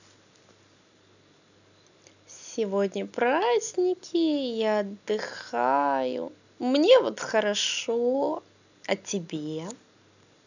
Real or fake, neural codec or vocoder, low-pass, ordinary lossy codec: real; none; 7.2 kHz; none